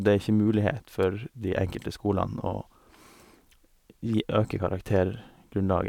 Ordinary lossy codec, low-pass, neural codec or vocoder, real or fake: none; 19.8 kHz; none; real